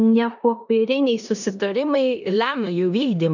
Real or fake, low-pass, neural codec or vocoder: fake; 7.2 kHz; codec, 16 kHz in and 24 kHz out, 0.9 kbps, LongCat-Audio-Codec, four codebook decoder